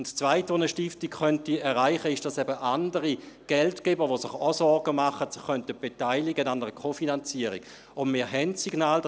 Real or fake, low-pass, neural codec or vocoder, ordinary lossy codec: real; none; none; none